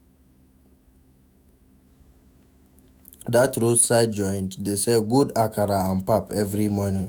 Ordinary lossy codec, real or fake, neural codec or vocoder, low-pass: none; fake; autoencoder, 48 kHz, 128 numbers a frame, DAC-VAE, trained on Japanese speech; none